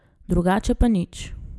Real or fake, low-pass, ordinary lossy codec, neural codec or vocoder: real; none; none; none